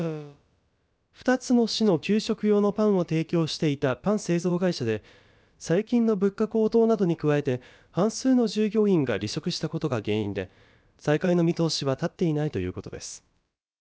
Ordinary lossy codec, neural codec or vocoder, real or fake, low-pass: none; codec, 16 kHz, about 1 kbps, DyCAST, with the encoder's durations; fake; none